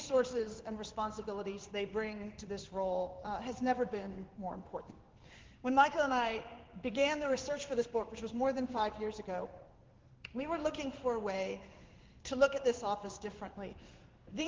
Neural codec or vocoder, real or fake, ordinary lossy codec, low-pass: codec, 16 kHz in and 24 kHz out, 1 kbps, XY-Tokenizer; fake; Opus, 16 kbps; 7.2 kHz